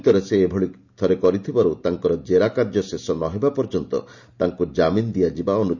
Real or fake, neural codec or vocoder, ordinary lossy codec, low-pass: real; none; none; 7.2 kHz